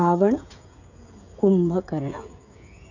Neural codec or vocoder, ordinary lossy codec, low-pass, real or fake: codec, 16 kHz, 16 kbps, FreqCodec, smaller model; none; 7.2 kHz; fake